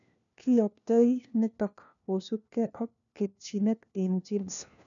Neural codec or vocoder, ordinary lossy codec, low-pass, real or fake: codec, 16 kHz, 1 kbps, FunCodec, trained on LibriTTS, 50 frames a second; none; 7.2 kHz; fake